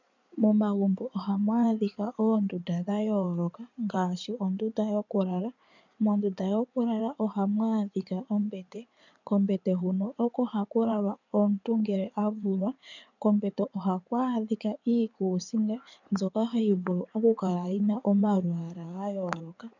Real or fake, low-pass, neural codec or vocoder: fake; 7.2 kHz; codec, 16 kHz in and 24 kHz out, 2.2 kbps, FireRedTTS-2 codec